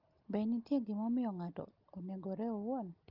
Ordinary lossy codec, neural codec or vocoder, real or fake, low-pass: Opus, 32 kbps; none; real; 5.4 kHz